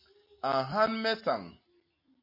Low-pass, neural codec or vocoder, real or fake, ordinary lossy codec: 5.4 kHz; none; real; MP3, 32 kbps